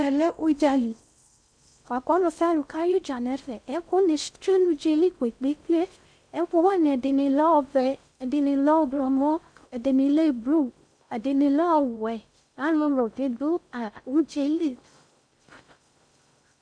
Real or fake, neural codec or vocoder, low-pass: fake; codec, 16 kHz in and 24 kHz out, 0.6 kbps, FocalCodec, streaming, 2048 codes; 9.9 kHz